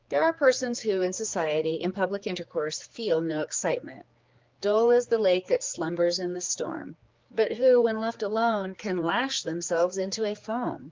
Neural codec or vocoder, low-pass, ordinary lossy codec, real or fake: codec, 16 kHz, 4 kbps, X-Codec, HuBERT features, trained on general audio; 7.2 kHz; Opus, 32 kbps; fake